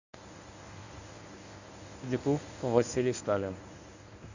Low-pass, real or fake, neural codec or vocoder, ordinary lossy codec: 7.2 kHz; fake; codec, 24 kHz, 0.9 kbps, WavTokenizer, medium speech release version 1; none